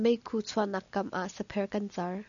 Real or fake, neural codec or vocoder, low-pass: real; none; 7.2 kHz